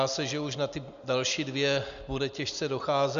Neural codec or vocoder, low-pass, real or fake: none; 7.2 kHz; real